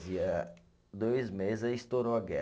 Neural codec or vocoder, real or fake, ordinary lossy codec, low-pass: none; real; none; none